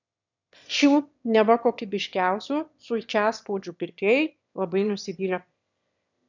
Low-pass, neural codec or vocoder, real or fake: 7.2 kHz; autoencoder, 22.05 kHz, a latent of 192 numbers a frame, VITS, trained on one speaker; fake